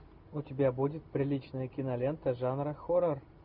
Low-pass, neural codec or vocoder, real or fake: 5.4 kHz; none; real